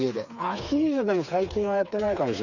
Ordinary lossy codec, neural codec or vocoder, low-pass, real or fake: none; codec, 16 kHz, 4 kbps, FreqCodec, smaller model; 7.2 kHz; fake